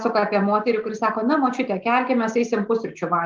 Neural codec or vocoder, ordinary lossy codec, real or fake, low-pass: none; Opus, 32 kbps; real; 7.2 kHz